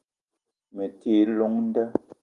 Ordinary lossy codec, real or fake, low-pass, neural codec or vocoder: Opus, 24 kbps; real; 10.8 kHz; none